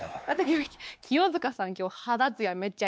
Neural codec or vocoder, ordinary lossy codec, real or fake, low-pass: codec, 16 kHz, 2 kbps, X-Codec, WavLM features, trained on Multilingual LibriSpeech; none; fake; none